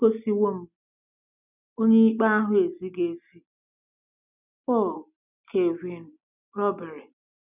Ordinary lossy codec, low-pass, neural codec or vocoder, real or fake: none; 3.6 kHz; none; real